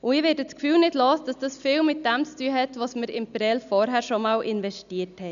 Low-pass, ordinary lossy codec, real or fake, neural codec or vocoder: 7.2 kHz; none; real; none